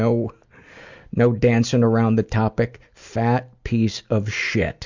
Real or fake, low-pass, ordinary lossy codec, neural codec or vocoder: real; 7.2 kHz; Opus, 64 kbps; none